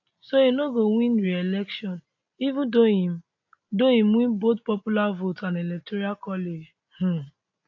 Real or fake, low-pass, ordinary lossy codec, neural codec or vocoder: real; 7.2 kHz; AAC, 48 kbps; none